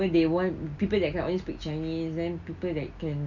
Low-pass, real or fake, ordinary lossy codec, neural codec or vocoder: 7.2 kHz; real; none; none